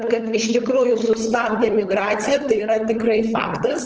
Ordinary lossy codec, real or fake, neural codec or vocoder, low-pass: Opus, 16 kbps; fake; codec, 16 kHz, 8 kbps, FunCodec, trained on LibriTTS, 25 frames a second; 7.2 kHz